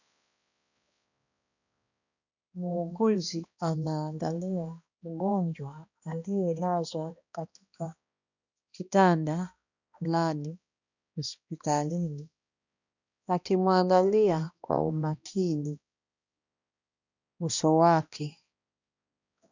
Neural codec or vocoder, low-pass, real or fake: codec, 16 kHz, 1 kbps, X-Codec, HuBERT features, trained on balanced general audio; 7.2 kHz; fake